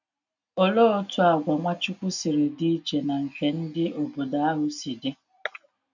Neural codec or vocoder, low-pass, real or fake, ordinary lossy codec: none; 7.2 kHz; real; none